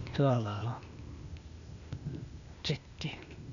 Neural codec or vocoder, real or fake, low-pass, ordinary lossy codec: codec, 16 kHz, 0.8 kbps, ZipCodec; fake; 7.2 kHz; none